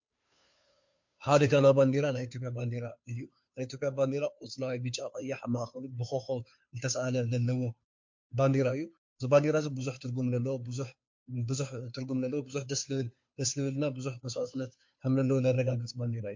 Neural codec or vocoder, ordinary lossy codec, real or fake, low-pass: codec, 16 kHz, 2 kbps, FunCodec, trained on Chinese and English, 25 frames a second; MP3, 48 kbps; fake; 7.2 kHz